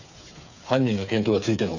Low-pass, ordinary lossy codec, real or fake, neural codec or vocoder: 7.2 kHz; none; fake; codec, 16 kHz, 8 kbps, FreqCodec, smaller model